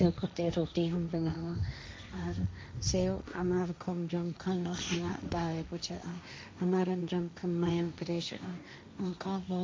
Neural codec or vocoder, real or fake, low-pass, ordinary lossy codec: codec, 16 kHz, 1.1 kbps, Voila-Tokenizer; fake; none; none